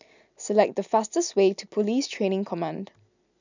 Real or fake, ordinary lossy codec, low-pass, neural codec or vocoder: real; none; 7.2 kHz; none